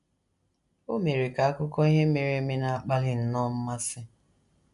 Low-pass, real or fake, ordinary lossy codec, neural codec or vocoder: 10.8 kHz; real; none; none